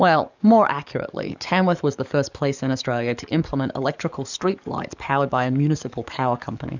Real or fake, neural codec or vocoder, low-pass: fake; codec, 44.1 kHz, 7.8 kbps, DAC; 7.2 kHz